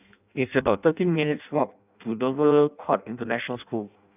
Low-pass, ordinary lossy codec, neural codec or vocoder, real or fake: 3.6 kHz; none; codec, 16 kHz in and 24 kHz out, 0.6 kbps, FireRedTTS-2 codec; fake